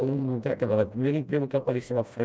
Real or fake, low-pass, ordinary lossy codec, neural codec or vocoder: fake; none; none; codec, 16 kHz, 1 kbps, FreqCodec, smaller model